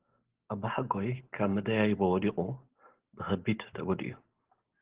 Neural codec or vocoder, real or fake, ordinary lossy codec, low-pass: none; real; Opus, 16 kbps; 3.6 kHz